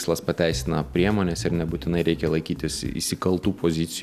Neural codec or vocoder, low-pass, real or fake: none; 14.4 kHz; real